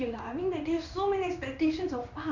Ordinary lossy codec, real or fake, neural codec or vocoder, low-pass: none; fake; codec, 16 kHz in and 24 kHz out, 1 kbps, XY-Tokenizer; 7.2 kHz